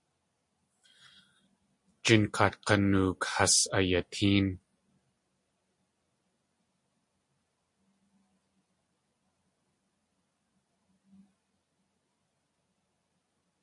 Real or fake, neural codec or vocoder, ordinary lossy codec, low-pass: real; none; MP3, 48 kbps; 10.8 kHz